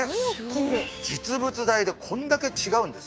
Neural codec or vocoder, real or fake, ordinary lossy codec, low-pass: codec, 16 kHz, 6 kbps, DAC; fake; none; none